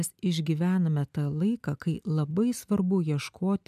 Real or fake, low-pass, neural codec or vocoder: real; 14.4 kHz; none